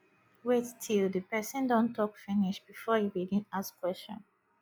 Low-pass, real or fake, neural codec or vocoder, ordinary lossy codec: none; real; none; none